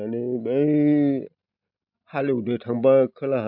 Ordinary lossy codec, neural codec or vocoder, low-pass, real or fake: none; none; 5.4 kHz; real